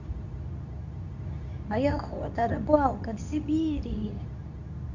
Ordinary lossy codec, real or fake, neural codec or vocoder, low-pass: none; fake; codec, 24 kHz, 0.9 kbps, WavTokenizer, medium speech release version 2; 7.2 kHz